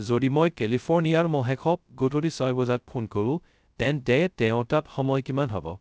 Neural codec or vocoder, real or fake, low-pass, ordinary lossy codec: codec, 16 kHz, 0.2 kbps, FocalCodec; fake; none; none